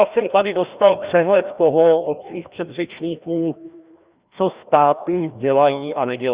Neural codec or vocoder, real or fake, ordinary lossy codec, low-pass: codec, 16 kHz, 1 kbps, FreqCodec, larger model; fake; Opus, 64 kbps; 3.6 kHz